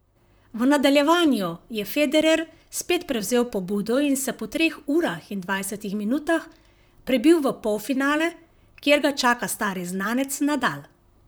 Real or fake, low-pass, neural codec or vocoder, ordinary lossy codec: fake; none; vocoder, 44.1 kHz, 128 mel bands, Pupu-Vocoder; none